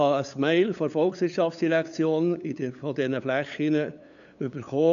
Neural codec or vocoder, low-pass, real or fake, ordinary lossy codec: codec, 16 kHz, 8 kbps, FunCodec, trained on LibriTTS, 25 frames a second; 7.2 kHz; fake; none